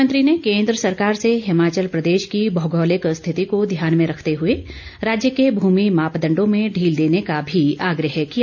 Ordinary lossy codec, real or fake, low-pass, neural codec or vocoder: none; real; 7.2 kHz; none